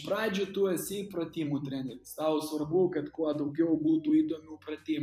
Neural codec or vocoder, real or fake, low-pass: vocoder, 44.1 kHz, 128 mel bands every 256 samples, BigVGAN v2; fake; 14.4 kHz